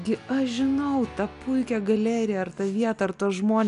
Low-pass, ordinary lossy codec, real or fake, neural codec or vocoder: 10.8 kHz; MP3, 96 kbps; real; none